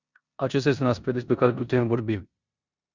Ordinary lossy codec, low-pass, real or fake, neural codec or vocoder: Opus, 64 kbps; 7.2 kHz; fake; codec, 16 kHz in and 24 kHz out, 0.9 kbps, LongCat-Audio-Codec, four codebook decoder